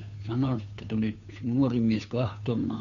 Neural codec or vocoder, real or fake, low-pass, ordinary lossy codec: codec, 16 kHz, 2 kbps, FunCodec, trained on Chinese and English, 25 frames a second; fake; 7.2 kHz; none